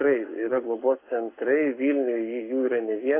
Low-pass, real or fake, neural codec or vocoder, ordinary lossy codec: 3.6 kHz; fake; codec, 44.1 kHz, 7.8 kbps, Pupu-Codec; AAC, 24 kbps